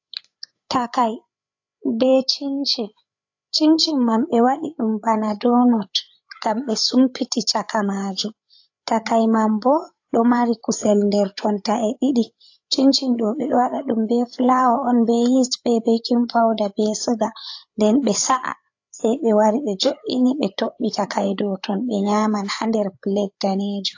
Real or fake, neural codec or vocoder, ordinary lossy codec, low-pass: fake; codec, 16 kHz, 8 kbps, FreqCodec, larger model; AAC, 48 kbps; 7.2 kHz